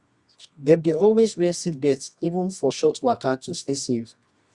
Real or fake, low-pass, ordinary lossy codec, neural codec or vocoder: fake; 10.8 kHz; Opus, 64 kbps; codec, 24 kHz, 0.9 kbps, WavTokenizer, medium music audio release